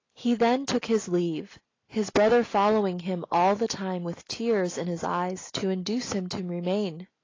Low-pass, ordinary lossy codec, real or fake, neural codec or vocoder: 7.2 kHz; AAC, 32 kbps; real; none